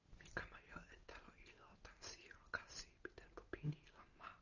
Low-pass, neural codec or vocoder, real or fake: 7.2 kHz; none; real